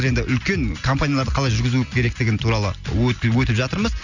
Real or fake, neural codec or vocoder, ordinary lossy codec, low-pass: real; none; none; 7.2 kHz